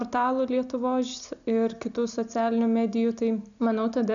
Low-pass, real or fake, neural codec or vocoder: 7.2 kHz; real; none